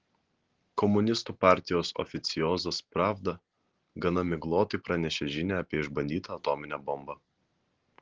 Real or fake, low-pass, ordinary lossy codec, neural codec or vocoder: real; 7.2 kHz; Opus, 16 kbps; none